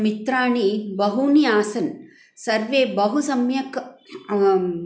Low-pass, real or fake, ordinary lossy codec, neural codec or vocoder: none; real; none; none